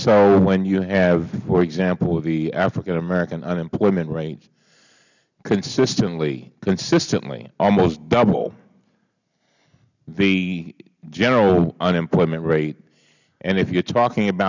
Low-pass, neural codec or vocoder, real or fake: 7.2 kHz; none; real